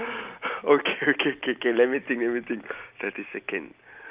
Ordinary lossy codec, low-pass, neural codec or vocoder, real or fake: Opus, 24 kbps; 3.6 kHz; none; real